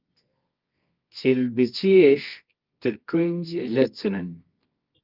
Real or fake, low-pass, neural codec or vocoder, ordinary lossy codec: fake; 5.4 kHz; codec, 24 kHz, 0.9 kbps, WavTokenizer, medium music audio release; Opus, 24 kbps